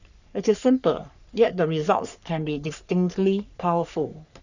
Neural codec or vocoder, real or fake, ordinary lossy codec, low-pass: codec, 44.1 kHz, 3.4 kbps, Pupu-Codec; fake; none; 7.2 kHz